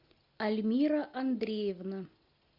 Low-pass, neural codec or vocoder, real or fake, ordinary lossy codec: 5.4 kHz; none; real; MP3, 48 kbps